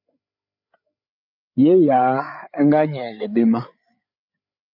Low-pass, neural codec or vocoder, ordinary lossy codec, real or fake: 5.4 kHz; codec, 16 kHz, 8 kbps, FreqCodec, larger model; MP3, 48 kbps; fake